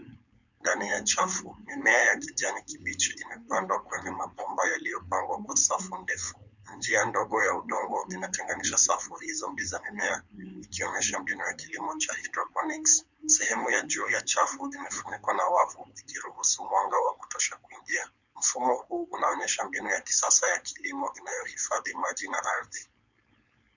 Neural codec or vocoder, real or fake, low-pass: codec, 16 kHz, 4.8 kbps, FACodec; fake; 7.2 kHz